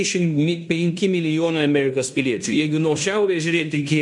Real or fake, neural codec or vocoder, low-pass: fake; codec, 16 kHz in and 24 kHz out, 0.9 kbps, LongCat-Audio-Codec, fine tuned four codebook decoder; 10.8 kHz